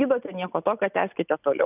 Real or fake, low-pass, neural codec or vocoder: real; 3.6 kHz; none